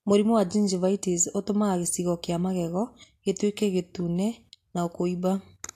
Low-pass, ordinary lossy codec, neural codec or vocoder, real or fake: 14.4 kHz; AAC, 64 kbps; none; real